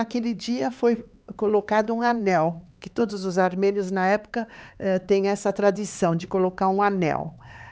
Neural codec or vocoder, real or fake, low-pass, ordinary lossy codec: codec, 16 kHz, 4 kbps, X-Codec, HuBERT features, trained on LibriSpeech; fake; none; none